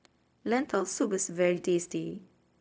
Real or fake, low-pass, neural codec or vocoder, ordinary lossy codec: fake; none; codec, 16 kHz, 0.4 kbps, LongCat-Audio-Codec; none